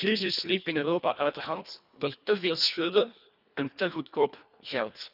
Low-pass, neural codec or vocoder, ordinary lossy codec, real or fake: 5.4 kHz; codec, 24 kHz, 1.5 kbps, HILCodec; none; fake